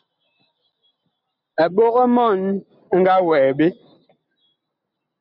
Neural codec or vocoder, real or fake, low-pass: none; real; 5.4 kHz